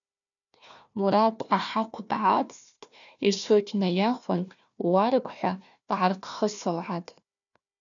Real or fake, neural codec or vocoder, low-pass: fake; codec, 16 kHz, 1 kbps, FunCodec, trained on Chinese and English, 50 frames a second; 7.2 kHz